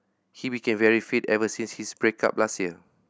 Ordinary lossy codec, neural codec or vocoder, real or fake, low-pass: none; none; real; none